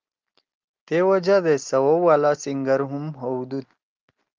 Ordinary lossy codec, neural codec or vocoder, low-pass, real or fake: Opus, 32 kbps; none; 7.2 kHz; real